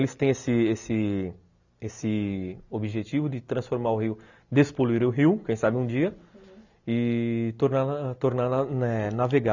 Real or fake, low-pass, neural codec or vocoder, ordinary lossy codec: real; 7.2 kHz; none; none